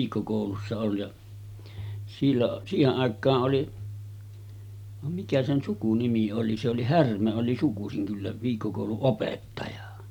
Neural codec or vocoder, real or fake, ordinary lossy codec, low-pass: none; real; none; 19.8 kHz